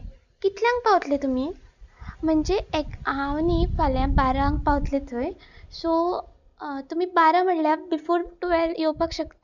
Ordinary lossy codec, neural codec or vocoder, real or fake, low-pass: none; none; real; 7.2 kHz